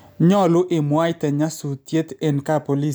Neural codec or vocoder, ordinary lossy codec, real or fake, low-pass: none; none; real; none